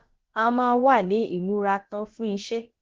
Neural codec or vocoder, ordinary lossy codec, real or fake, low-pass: codec, 16 kHz, about 1 kbps, DyCAST, with the encoder's durations; Opus, 16 kbps; fake; 7.2 kHz